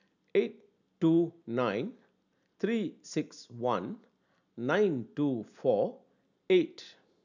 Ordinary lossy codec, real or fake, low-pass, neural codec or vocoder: none; real; 7.2 kHz; none